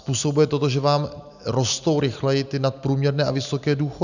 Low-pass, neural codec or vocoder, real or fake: 7.2 kHz; none; real